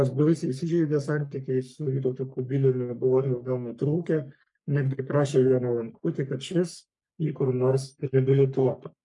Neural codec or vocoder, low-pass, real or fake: codec, 44.1 kHz, 3.4 kbps, Pupu-Codec; 10.8 kHz; fake